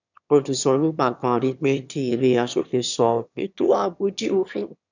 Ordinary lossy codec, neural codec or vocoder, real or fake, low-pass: none; autoencoder, 22.05 kHz, a latent of 192 numbers a frame, VITS, trained on one speaker; fake; 7.2 kHz